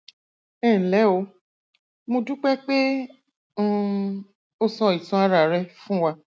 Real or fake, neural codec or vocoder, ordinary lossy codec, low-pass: real; none; none; none